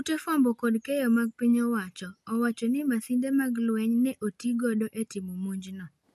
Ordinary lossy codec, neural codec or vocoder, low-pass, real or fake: MP3, 64 kbps; none; 14.4 kHz; real